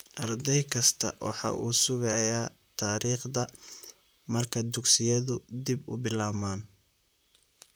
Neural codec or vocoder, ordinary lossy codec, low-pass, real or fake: vocoder, 44.1 kHz, 128 mel bands, Pupu-Vocoder; none; none; fake